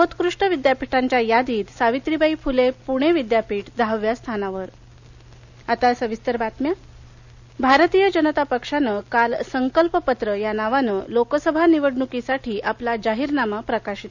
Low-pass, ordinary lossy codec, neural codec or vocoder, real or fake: 7.2 kHz; none; none; real